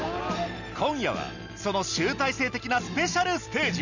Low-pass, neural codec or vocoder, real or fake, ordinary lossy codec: 7.2 kHz; none; real; none